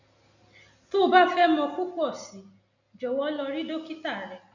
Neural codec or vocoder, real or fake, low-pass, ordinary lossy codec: none; real; 7.2 kHz; none